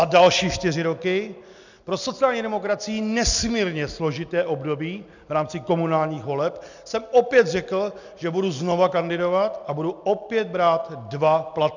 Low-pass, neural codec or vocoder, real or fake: 7.2 kHz; none; real